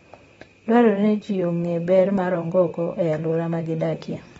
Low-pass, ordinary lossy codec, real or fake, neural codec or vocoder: 19.8 kHz; AAC, 24 kbps; fake; vocoder, 44.1 kHz, 128 mel bands, Pupu-Vocoder